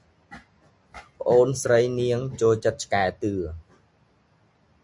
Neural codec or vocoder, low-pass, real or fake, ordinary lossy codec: none; 10.8 kHz; real; MP3, 64 kbps